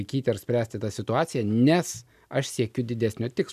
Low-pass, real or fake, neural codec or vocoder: 14.4 kHz; real; none